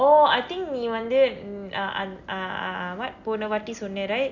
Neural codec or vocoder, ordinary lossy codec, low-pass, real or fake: none; none; 7.2 kHz; real